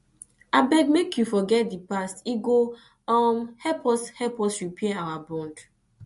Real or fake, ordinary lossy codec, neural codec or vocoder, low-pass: real; MP3, 48 kbps; none; 14.4 kHz